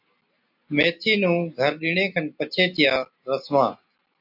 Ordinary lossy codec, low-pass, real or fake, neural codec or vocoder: AAC, 48 kbps; 5.4 kHz; real; none